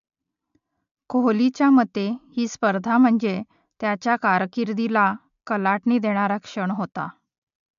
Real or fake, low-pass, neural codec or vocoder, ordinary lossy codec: real; 7.2 kHz; none; MP3, 64 kbps